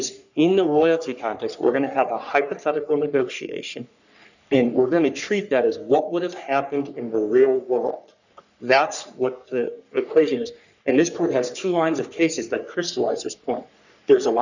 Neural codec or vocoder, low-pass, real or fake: codec, 44.1 kHz, 3.4 kbps, Pupu-Codec; 7.2 kHz; fake